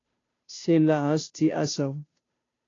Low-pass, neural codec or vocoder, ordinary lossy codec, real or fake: 7.2 kHz; codec, 16 kHz, 0.5 kbps, FunCodec, trained on Chinese and English, 25 frames a second; AAC, 32 kbps; fake